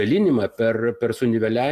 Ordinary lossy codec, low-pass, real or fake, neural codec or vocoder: AAC, 96 kbps; 14.4 kHz; real; none